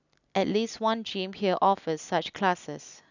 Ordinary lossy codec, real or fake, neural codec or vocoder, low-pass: none; real; none; 7.2 kHz